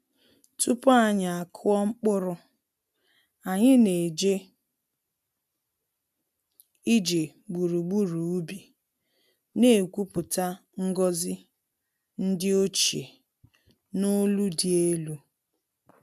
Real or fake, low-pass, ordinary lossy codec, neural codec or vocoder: real; 14.4 kHz; none; none